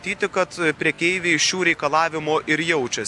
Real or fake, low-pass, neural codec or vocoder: real; 10.8 kHz; none